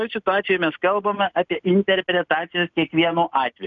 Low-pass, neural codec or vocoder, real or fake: 7.2 kHz; none; real